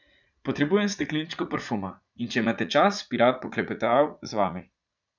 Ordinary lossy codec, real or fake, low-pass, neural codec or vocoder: none; fake; 7.2 kHz; vocoder, 44.1 kHz, 80 mel bands, Vocos